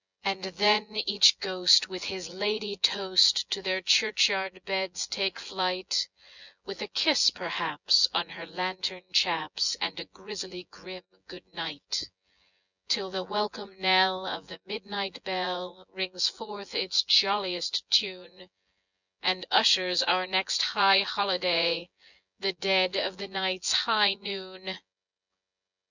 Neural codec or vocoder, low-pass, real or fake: vocoder, 24 kHz, 100 mel bands, Vocos; 7.2 kHz; fake